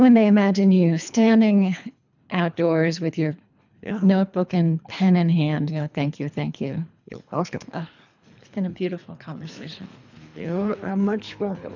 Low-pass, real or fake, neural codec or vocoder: 7.2 kHz; fake; codec, 24 kHz, 3 kbps, HILCodec